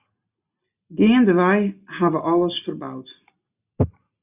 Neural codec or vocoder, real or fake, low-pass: none; real; 3.6 kHz